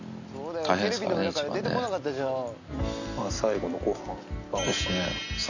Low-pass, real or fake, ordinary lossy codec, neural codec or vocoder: 7.2 kHz; real; none; none